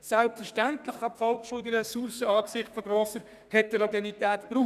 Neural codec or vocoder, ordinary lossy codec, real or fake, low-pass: codec, 32 kHz, 1.9 kbps, SNAC; none; fake; 14.4 kHz